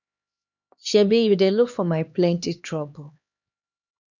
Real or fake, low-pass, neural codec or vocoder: fake; 7.2 kHz; codec, 16 kHz, 2 kbps, X-Codec, HuBERT features, trained on LibriSpeech